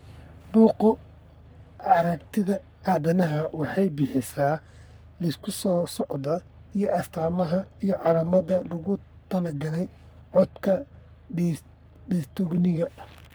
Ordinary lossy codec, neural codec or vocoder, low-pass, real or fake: none; codec, 44.1 kHz, 3.4 kbps, Pupu-Codec; none; fake